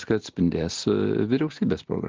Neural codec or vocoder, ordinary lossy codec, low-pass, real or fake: none; Opus, 16 kbps; 7.2 kHz; real